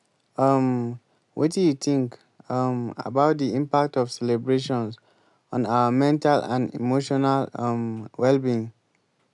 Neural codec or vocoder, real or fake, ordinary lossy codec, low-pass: none; real; none; 10.8 kHz